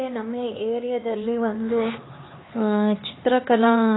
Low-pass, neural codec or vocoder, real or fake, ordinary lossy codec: 7.2 kHz; codec, 16 kHz, 4 kbps, X-Codec, HuBERT features, trained on LibriSpeech; fake; AAC, 16 kbps